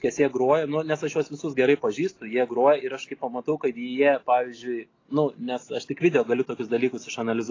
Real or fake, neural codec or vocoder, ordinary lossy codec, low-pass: real; none; AAC, 32 kbps; 7.2 kHz